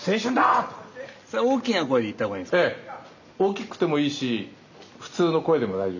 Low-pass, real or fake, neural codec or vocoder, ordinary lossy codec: 7.2 kHz; real; none; none